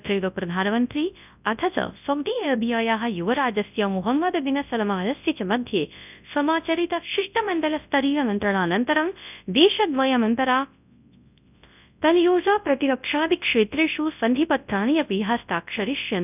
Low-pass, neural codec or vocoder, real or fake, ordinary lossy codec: 3.6 kHz; codec, 24 kHz, 0.9 kbps, WavTokenizer, large speech release; fake; none